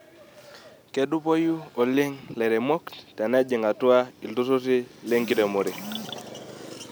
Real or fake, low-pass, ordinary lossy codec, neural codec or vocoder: real; none; none; none